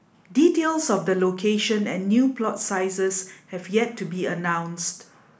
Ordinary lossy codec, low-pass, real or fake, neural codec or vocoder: none; none; real; none